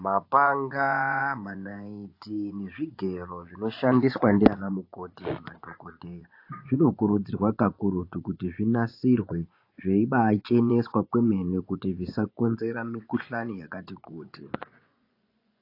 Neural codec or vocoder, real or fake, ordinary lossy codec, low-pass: vocoder, 24 kHz, 100 mel bands, Vocos; fake; AAC, 32 kbps; 5.4 kHz